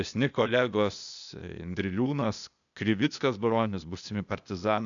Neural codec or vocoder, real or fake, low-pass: codec, 16 kHz, 0.8 kbps, ZipCodec; fake; 7.2 kHz